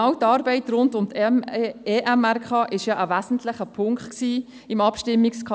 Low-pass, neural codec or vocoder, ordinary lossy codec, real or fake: none; none; none; real